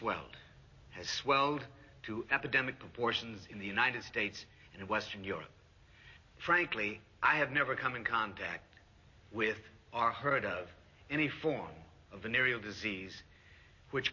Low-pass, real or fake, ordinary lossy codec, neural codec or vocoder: 7.2 kHz; real; MP3, 32 kbps; none